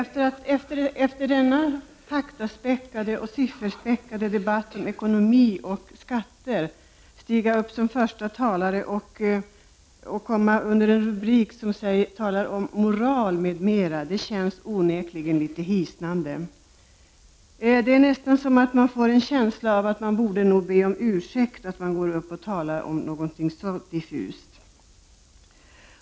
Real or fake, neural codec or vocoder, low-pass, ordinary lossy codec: real; none; none; none